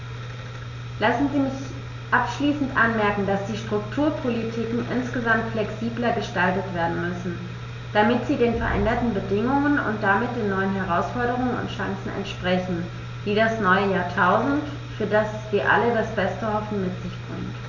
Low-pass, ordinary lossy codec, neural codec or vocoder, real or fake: 7.2 kHz; none; none; real